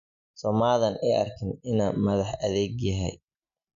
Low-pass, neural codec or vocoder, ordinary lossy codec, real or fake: 7.2 kHz; none; MP3, 96 kbps; real